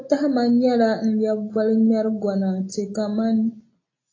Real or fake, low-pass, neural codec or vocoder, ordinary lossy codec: real; 7.2 kHz; none; AAC, 32 kbps